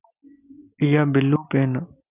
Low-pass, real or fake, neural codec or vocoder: 3.6 kHz; real; none